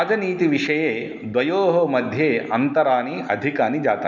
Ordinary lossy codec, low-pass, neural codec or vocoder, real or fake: none; 7.2 kHz; none; real